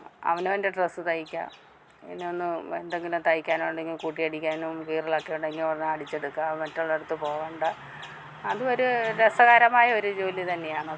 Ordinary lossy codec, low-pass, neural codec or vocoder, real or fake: none; none; none; real